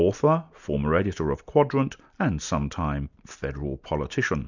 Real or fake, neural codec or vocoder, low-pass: real; none; 7.2 kHz